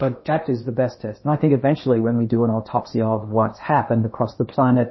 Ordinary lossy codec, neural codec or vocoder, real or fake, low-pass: MP3, 24 kbps; codec, 16 kHz in and 24 kHz out, 0.8 kbps, FocalCodec, streaming, 65536 codes; fake; 7.2 kHz